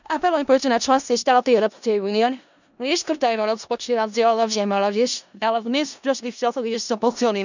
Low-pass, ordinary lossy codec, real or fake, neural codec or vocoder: 7.2 kHz; none; fake; codec, 16 kHz in and 24 kHz out, 0.4 kbps, LongCat-Audio-Codec, four codebook decoder